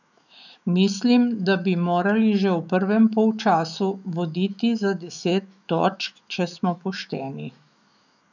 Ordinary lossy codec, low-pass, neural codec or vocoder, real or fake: none; 7.2 kHz; autoencoder, 48 kHz, 128 numbers a frame, DAC-VAE, trained on Japanese speech; fake